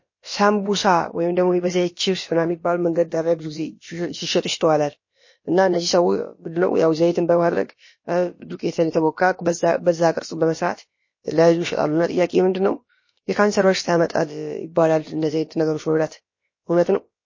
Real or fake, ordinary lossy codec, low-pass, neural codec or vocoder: fake; MP3, 32 kbps; 7.2 kHz; codec, 16 kHz, about 1 kbps, DyCAST, with the encoder's durations